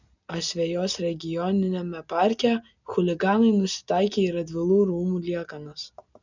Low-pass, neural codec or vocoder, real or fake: 7.2 kHz; none; real